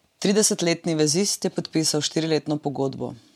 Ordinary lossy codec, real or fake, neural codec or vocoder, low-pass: MP3, 96 kbps; fake; vocoder, 44.1 kHz, 128 mel bands every 512 samples, BigVGAN v2; 19.8 kHz